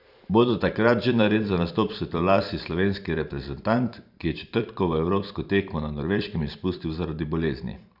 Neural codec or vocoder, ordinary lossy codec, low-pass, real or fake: vocoder, 44.1 kHz, 128 mel bands every 512 samples, BigVGAN v2; none; 5.4 kHz; fake